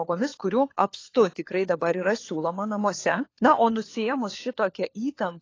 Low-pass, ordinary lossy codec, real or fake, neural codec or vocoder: 7.2 kHz; AAC, 32 kbps; fake; codec, 16 kHz, 4 kbps, FunCodec, trained on LibriTTS, 50 frames a second